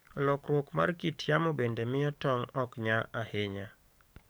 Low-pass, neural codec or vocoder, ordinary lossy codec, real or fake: none; codec, 44.1 kHz, 7.8 kbps, DAC; none; fake